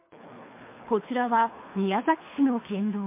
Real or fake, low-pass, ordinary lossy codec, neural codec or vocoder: fake; 3.6 kHz; MP3, 24 kbps; codec, 24 kHz, 3 kbps, HILCodec